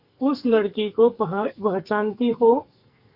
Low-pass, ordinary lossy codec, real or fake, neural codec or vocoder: 5.4 kHz; Opus, 64 kbps; fake; codec, 44.1 kHz, 2.6 kbps, SNAC